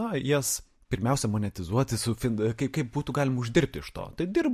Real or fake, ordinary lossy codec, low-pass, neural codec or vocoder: real; MP3, 64 kbps; 14.4 kHz; none